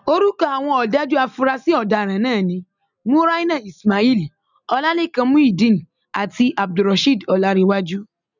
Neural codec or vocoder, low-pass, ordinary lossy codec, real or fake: none; 7.2 kHz; none; real